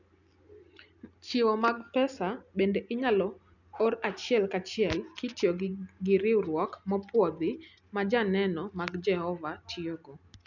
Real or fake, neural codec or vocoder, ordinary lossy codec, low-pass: real; none; none; 7.2 kHz